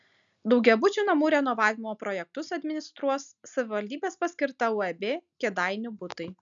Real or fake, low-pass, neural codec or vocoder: real; 7.2 kHz; none